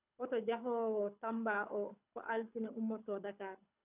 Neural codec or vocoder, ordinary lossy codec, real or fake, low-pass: codec, 24 kHz, 6 kbps, HILCodec; none; fake; 3.6 kHz